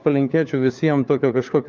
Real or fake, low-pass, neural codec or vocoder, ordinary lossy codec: fake; 7.2 kHz; codec, 16 kHz, 4 kbps, FunCodec, trained on LibriTTS, 50 frames a second; Opus, 24 kbps